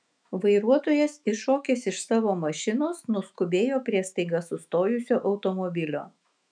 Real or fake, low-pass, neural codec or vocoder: fake; 9.9 kHz; autoencoder, 48 kHz, 128 numbers a frame, DAC-VAE, trained on Japanese speech